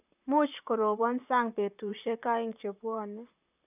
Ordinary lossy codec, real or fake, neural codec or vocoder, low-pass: none; fake; vocoder, 44.1 kHz, 128 mel bands, Pupu-Vocoder; 3.6 kHz